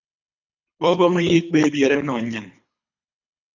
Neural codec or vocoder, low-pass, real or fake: codec, 24 kHz, 3 kbps, HILCodec; 7.2 kHz; fake